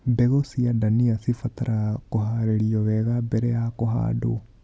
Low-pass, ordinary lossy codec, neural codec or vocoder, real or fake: none; none; none; real